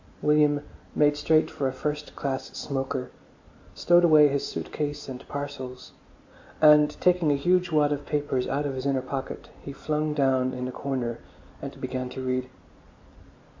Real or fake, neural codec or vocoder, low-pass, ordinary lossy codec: real; none; 7.2 kHz; MP3, 48 kbps